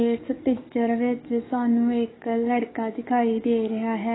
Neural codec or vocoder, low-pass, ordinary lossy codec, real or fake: codec, 44.1 kHz, 7.8 kbps, DAC; 7.2 kHz; AAC, 16 kbps; fake